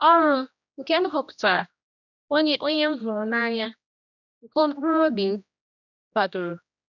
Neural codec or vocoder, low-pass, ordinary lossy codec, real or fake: codec, 16 kHz, 1 kbps, X-Codec, HuBERT features, trained on general audio; 7.2 kHz; none; fake